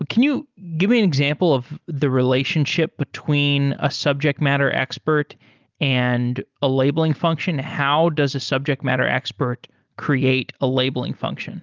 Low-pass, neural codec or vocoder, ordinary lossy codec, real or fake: 7.2 kHz; none; Opus, 32 kbps; real